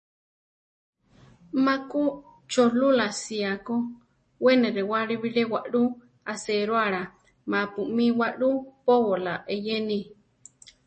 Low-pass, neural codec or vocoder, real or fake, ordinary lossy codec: 9.9 kHz; none; real; MP3, 32 kbps